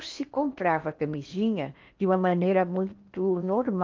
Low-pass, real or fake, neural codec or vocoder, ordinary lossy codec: 7.2 kHz; fake; codec, 16 kHz in and 24 kHz out, 0.8 kbps, FocalCodec, streaming, 65536 codes; Opus, 24 kbps